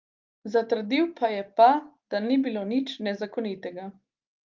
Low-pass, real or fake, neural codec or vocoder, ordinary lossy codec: 7.2 kHz; real; none; Opus, 24 kbps